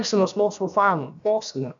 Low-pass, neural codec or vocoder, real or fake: 7.2 kHz; codec, 16 kHz, about 1 kbps, DyCAST, with the encoder's durations; fake